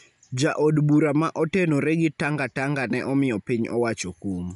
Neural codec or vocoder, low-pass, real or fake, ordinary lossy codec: none; 10.8 kHz; real; none